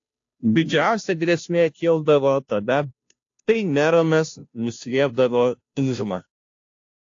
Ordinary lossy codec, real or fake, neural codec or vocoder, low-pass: AAC, 48 kbps; fake; codec, 16 kHz, 0.5 kbps, FunCodec, trained on Chinese and English, 25 frames a second; 7.2 kHz